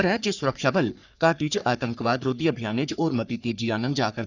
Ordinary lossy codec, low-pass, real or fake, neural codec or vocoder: none; 7.2 kHz; fake; codec, 44.1 kHz, 3.4 kbps, Pupu-Codec